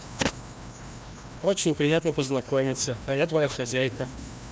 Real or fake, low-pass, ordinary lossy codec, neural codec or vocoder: fake; none; none; codec, 16 kHz, 1 kbps, FreqCodec, larger model